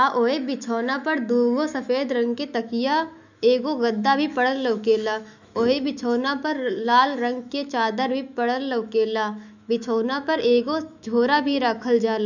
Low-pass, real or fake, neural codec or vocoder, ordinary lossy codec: 7.2 kHz; fake; autoencoder, 48 kHz, 128 numbers a frame, DAC-VAE, trained on Japanese speech; none